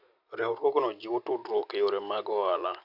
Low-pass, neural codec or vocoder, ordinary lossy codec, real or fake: 5.4 kHz; none; none; real